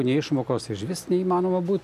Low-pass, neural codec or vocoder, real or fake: 14.4 kHz; none; real